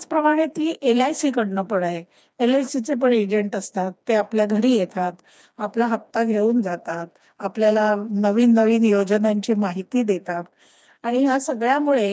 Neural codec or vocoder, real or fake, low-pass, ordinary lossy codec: codec, 16 kHz, 2 kbps, FreqCodec, smaller model; fake; none; none